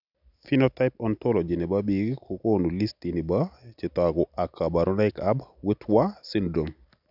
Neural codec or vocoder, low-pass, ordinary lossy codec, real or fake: none; 5.4 kHz; none; real